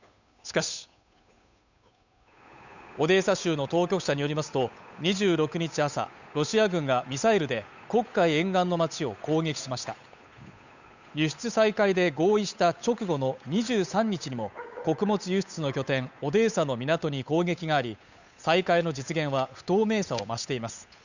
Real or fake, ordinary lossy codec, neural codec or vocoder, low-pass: fake; none; codec, 16 kHz, 8 kbps, FunCodec, trained on Chinese and English, 25 frames a second; 7.2 kHz